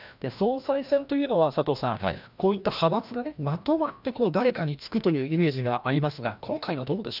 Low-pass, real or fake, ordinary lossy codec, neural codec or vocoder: 5.4 kHz; fake; none; codec, 16 kHz, 1 kbps, FreqCodec, larger model